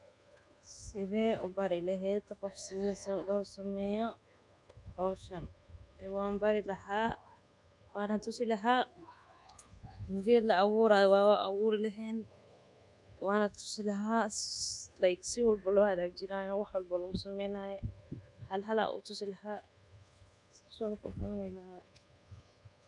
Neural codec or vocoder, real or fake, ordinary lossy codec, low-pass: codec, 24 kHz, 1.2 kbps, DualCodec; fake; none; 10.8 kHz